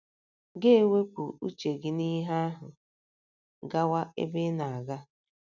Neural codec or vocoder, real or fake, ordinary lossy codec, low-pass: none; real; none; 7.2 kHz